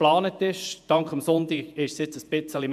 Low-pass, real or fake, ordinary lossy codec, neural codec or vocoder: 14.4 kHz; fake; MP3, 96 kbps; vocoder, 48 kHz, 128 mel bands, Vocos